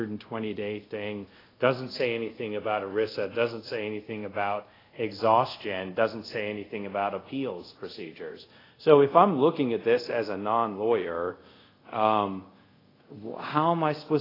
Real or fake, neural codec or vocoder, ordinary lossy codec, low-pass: fake; codec, 24 kHz, 0.5 kbps, DualCodec; AAC, 24 kbps; 5.4 kHz